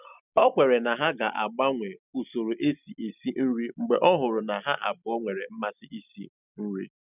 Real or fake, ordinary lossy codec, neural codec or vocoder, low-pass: real; none; none; 3.6 kHz